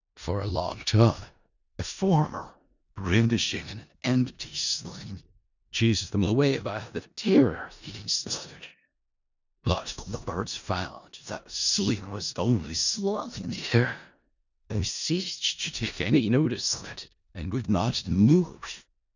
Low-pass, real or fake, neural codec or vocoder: 7.2 kHz; fake; codec, 16 kHz in and 24 kHz out, 0.4 kbps, LongCat-Audio-Codec, four codebook decoder